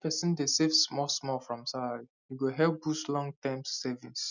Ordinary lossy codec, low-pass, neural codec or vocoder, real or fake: none; 7.2 kHz; none; real